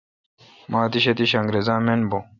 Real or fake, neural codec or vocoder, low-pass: real; none; 7.2 kHz